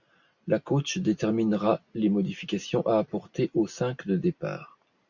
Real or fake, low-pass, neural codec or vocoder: real; 7.2 kHz; none